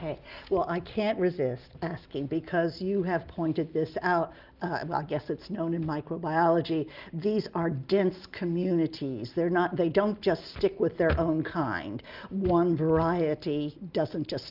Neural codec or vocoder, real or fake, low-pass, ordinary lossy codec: none; real; 5.4 kHz; Opus, 24 kbps